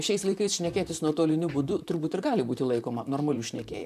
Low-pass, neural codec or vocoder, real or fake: 14.4 kHz; vocoder, 44.1 kHz, 128 mel bands, Pupu-Vocoder; fake